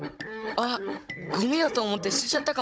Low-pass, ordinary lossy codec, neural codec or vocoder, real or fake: none; none; codec, 16 kHz, 16 kbps, FunCodec, trained on Chinese and English, 50 frames a second; fake